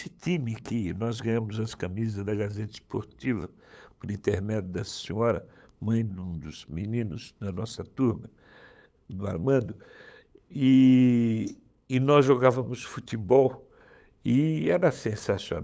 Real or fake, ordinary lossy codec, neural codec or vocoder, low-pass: fake; none; codec, 16 kHz, 8 kbps, FunCodec, trained on LibriTTS, 25 frames a second; none